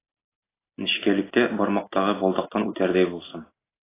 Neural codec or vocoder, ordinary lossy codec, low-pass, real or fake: none; AAC, 16 kbps; 3.6 kHz; real